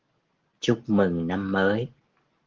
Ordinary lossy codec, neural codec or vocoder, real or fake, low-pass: Opus, 16 kbps; none; real; 7.2 kHz